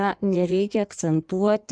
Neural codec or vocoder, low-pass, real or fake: codec, 16 kHz in and 24 kHz out, 1.1 kbps, FireRedTTS-2 codec; 9.9 kHz; fake